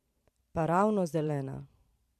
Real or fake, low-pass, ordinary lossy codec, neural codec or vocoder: fake; 14.4 kHz; MP3, 64 kbps; vocoder, 44.1 kHz, 128 mel bands every 512 samples, BigVGAN v2